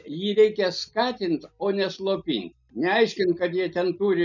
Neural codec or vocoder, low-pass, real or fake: none; 7.2 kHz; real